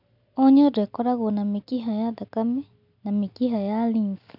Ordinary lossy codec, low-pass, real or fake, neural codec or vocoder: none; 5.4 kHz; real; none